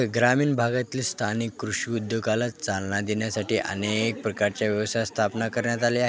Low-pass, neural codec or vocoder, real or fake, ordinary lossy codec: none; none; real; none